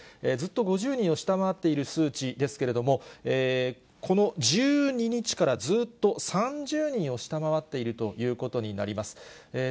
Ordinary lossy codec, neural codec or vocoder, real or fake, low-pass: none; none; real; none